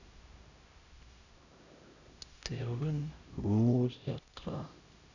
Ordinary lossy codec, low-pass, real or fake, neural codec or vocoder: none; 7.2 kHz; fake; codec, 16 kHz, 0.5 kbps, X-Codec, HuBERT features, trained on LibriSpeech